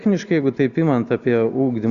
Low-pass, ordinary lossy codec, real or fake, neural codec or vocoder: 7.2 kHz; Opus, 64 kbps; real; none